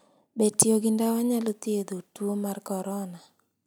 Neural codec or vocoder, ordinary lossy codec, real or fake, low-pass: none; none; real; none